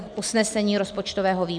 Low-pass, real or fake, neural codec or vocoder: 9.9 kHz; real; none